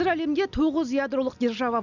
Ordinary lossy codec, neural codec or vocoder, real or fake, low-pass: none; none; real; 7.2 kHz